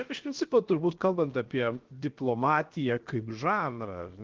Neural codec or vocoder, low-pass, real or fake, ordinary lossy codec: codec, 16 kHz, 0.7 kbps, FocalCodec; 7.2 kHz; fake; Opus, 16 kbps